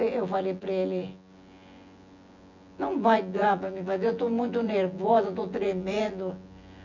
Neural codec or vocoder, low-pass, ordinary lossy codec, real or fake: vocoder, 24 kHz, 100 mel bands, Vocos; 7.2 kHz; none; fake